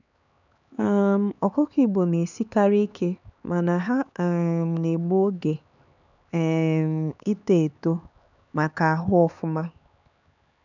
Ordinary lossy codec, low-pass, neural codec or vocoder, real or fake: none; 7.2 kHz; codec, 16 kHz, 4 kbps, X-Codec, HuBERT features, trained on LibriSpeech; fake